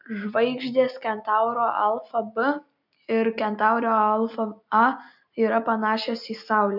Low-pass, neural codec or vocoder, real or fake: 5.4 kHz; none; real